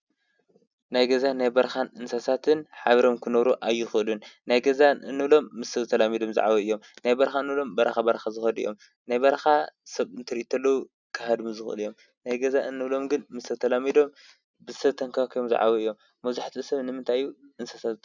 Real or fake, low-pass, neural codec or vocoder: real; 7.2 kHz; none